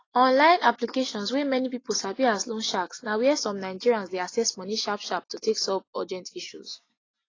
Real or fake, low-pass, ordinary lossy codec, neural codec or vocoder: real; 7.2 kHz; AAC, 32 kbps; none